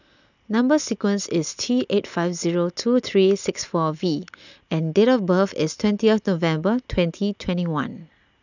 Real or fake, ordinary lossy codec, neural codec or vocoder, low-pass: real; none; none; 7.2 kHz